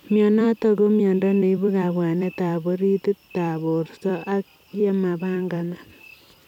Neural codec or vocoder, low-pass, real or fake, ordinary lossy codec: vocoder, 44.1 kHz, 128 mel bands every 256 samples, BigVGAN v2; 19.8 kHz; fake; none